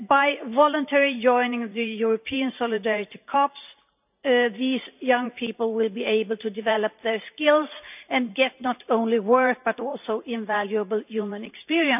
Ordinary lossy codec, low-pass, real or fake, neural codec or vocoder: none; 3.6 kHz; fake; vocoder, 44.1 kHz, 128 mel bands every 512 samples, BigVGAN v2